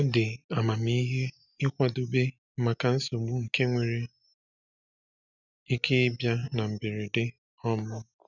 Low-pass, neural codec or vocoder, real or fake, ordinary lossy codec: 7.2 kHz; none; real; none